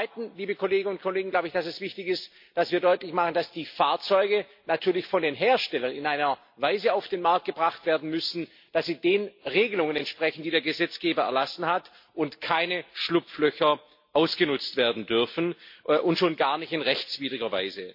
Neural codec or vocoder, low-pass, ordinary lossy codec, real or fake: none; 5.4 kHz; MP3, 32 kbps; real